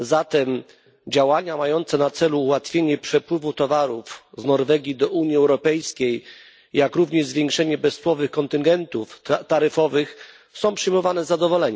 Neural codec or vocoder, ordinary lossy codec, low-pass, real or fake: none; none; none; real